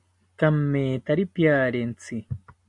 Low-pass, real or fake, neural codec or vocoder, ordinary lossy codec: 10.8 kHz; real; none; MP3, 64 kbps